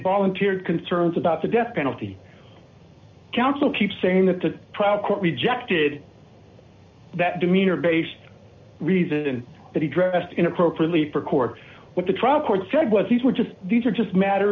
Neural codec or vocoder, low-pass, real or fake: none; 7.2 kHz; real